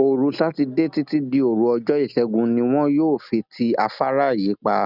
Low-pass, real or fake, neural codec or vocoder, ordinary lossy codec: 5.4 kHz; real; none; none